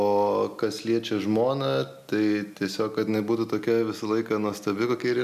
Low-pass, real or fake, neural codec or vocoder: 14.4 kHz; real; none